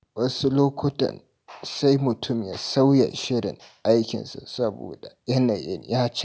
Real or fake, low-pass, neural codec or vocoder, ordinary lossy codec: real; none; none; none